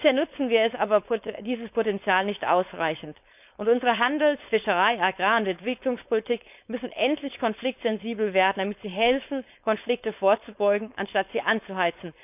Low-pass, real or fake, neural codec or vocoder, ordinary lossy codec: 3.6 kHz; fake; codec, 16 kHz, 4.8 kbps, FACodec; none